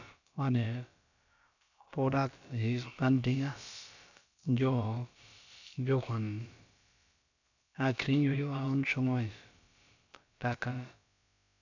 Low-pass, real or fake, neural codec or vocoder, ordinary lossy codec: 7.2 kHz; fake; codec, 16 kHz, about 1 kbps, DyCAST, with the encoder's durations; none